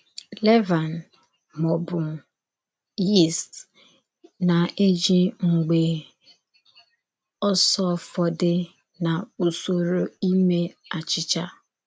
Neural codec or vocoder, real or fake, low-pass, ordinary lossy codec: none; real; none; none